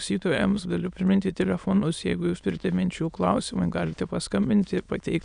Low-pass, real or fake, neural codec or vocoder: 9.9 kHz; fake; autoencoder, 22.05 kHz, a latent of 192 numbers a frame, VITS, trained on many speakers